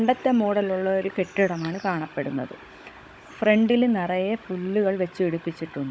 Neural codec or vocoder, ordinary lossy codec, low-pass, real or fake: codec, 16 kHz, 16 kbps, FunCodec, trained on Chinese and English, 50 frames a second; none; none; fake